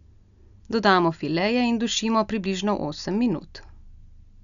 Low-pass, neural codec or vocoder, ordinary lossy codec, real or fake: 7.2 kHz; none; none; real